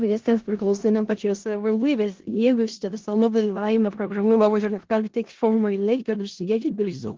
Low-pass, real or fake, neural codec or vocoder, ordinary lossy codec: 7.2 kHz; fake; codec, 16 kHz in and 24 kHz out, 0.4 kbps, LongCat-Audio-Codec, four codebook decoder; Opus, 16 kbps